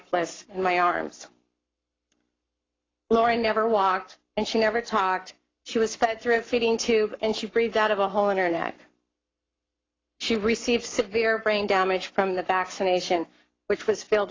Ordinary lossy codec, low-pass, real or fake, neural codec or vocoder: AAC, 32 kbps; 7.2 kHz; fake; codec, 44.1 kHz, 7.8 kbps, Pupu-Codec